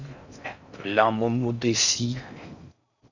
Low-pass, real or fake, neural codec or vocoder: 7.2 kHz; fake; codec, 16 kHz in and 24 kHz out, 0.6 kbps, FocalCodec, streaming, 4096 codes